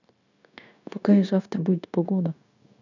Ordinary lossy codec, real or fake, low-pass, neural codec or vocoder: none; fake; 7.2 kHz; codec, 16 kHz, 0.9 kbps, LongCat-Audio-Codec